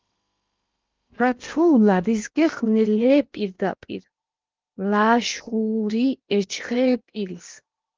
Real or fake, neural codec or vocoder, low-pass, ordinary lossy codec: fake; codec, 16 kHz in and 24 kHz out, 0.8 kbps, FocalCodec, streaming, 65536 codes; 7.2 kHz; Opus, 24 kbps